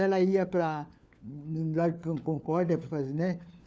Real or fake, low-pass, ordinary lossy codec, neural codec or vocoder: fake; none; none; codec, 16 kHz, 4 kbps, FunCodec, trained on LibriTTS, 50 frames a second